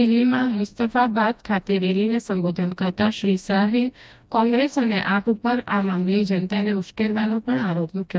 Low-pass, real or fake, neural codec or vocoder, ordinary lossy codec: none; fake; codec, 16 kHz, 1 kbps, FreqCodec, smaller model; none